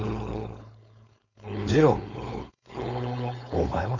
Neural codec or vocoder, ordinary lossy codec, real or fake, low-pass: codec, 16 kHz, 4.8 kbps, FACodec; none; fake; 7.2 kHz